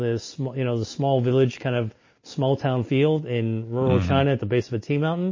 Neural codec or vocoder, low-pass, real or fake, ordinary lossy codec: none; 7.2 kHz; real; MP3, 32 kbps